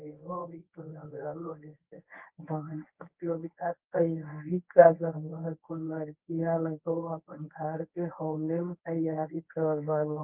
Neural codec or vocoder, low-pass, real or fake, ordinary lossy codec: codec, 24 kHz, 0.9 kbps, WavTokenizer, medium speech release version 1; 3.6 kHz; fake; Opus, 24 kbps